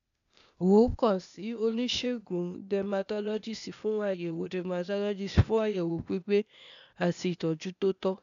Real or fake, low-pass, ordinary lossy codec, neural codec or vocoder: fake; 7.2 kHz; none; codec, 16 kHz, 0.8 kbps, ZipCodec